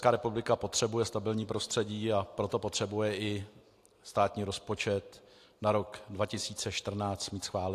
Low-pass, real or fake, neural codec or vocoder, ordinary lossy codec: 14.4 kHz; real; none; AAC, 64 kbps